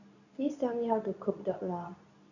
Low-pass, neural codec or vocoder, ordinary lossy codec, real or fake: 7.2 kHz; codec, 24 kHz, 0.9 kbps, WavTokenizer, medium speech release version 2; none; fake